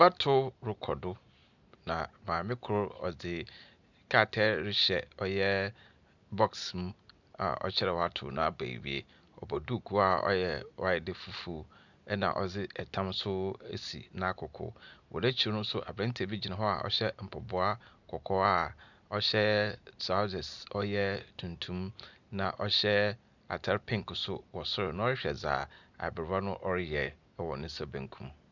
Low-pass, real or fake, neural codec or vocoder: 7.2 kHz; real; none